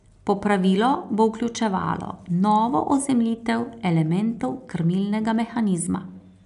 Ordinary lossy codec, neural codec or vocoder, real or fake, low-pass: none; none; real; 10.8 kHz